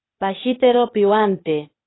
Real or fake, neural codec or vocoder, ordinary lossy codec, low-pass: fake; codec, 16 kHz, 0.8 kbps, ZipCodec; AAC, 16 kbps; 7.2 kHz